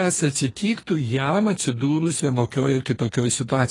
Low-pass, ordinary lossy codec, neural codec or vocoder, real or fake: 10.8 kHz; AAC, 32 kbps; codec, 32 kHz, 1.9 kbps, SNAC; fake